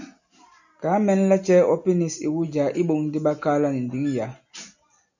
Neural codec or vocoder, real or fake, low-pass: none; real; 7.2 kHz